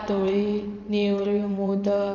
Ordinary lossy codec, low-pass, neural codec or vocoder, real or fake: none; 7.2 kHz; vocoder, 22.05 kHz, 80 mel bands, WaveNeXt; fake